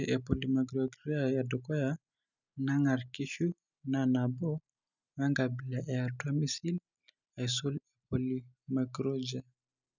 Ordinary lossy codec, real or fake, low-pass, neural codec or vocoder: none; real; 7.2 kHz; none